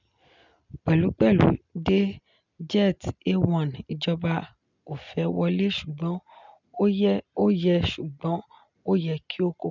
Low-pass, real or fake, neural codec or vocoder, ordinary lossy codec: 7.2 kHz; real; none; none